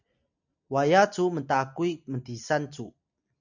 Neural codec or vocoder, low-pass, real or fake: none; 7.2 kHz; real